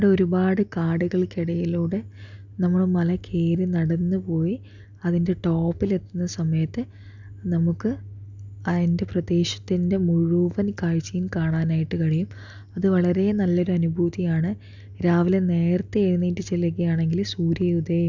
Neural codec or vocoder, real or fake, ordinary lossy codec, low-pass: none; real; none; 7.2 kHz